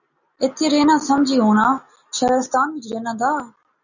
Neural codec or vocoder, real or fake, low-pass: none; real; 7.2 kHz